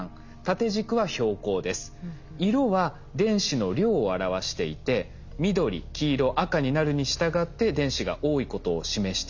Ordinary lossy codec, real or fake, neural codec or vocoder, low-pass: none; real; none; 7.2 kHz